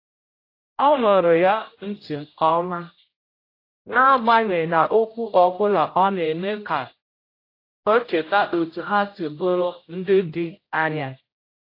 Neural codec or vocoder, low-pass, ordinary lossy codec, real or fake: codec, 16 kHz, 0.5 kbps, X-Codec, HuBERT features, trained on general audio; 5.4 kHz; AAC, 32 kbps; fake